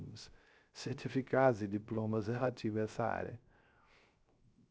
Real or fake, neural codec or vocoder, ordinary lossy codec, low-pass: fake; codec, 16 kHz, 0.3 kbps, FocalCodec; none; none